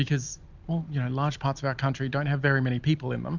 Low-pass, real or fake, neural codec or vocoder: 7.2 kHz; real; none